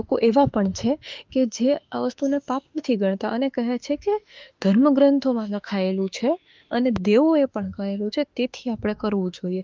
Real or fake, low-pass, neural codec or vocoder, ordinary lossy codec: fake; 7.2 kHz; autoencoder, 48 kHz, 32 numbers a frame, DAC-VAE, trained on Japanese speech; Opus, 32 kbps